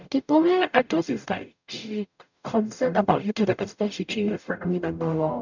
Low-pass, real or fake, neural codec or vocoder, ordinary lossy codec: 7.2 kHz; fake; codec, 44.1 kHz, 0.9 kbps, DAC; none